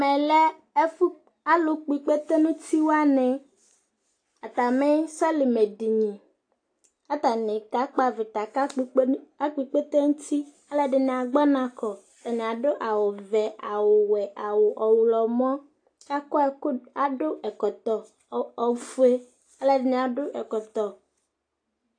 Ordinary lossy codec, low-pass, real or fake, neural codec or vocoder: AAC, 48 kbps; 9.9 kHz; real; none